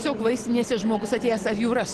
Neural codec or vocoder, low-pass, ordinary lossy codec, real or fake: none; 10.8 kHz; Opus, 16 kbps; real